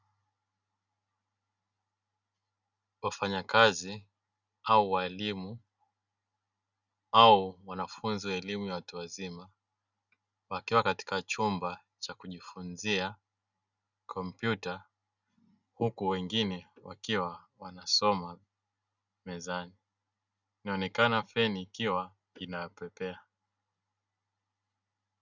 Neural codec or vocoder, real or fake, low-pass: none; real; 7.2 kHz